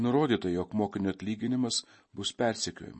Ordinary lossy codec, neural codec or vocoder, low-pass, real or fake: MP3, 32 kbps; none; 9.9 kHz; real